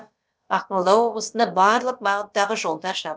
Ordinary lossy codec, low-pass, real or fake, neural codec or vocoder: none; none; fake; codec, 16 kHz, about 1 kbps, DyCAST, with the encoder's durations